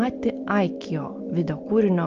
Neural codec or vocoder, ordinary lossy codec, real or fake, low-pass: none; Opus, 24 kbps; real; 7.2 kHz